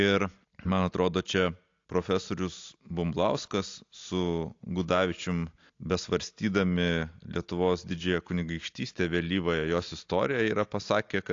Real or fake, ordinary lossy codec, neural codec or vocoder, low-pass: real; AAC, 48 kbps; none; 7.2 kHz